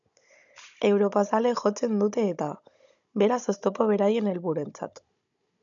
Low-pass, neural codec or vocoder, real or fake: 7.2 kHz; codec, 16 kHz, 16 kbps, FunCodec, trained on Chinese and English, 50 frames a second; fake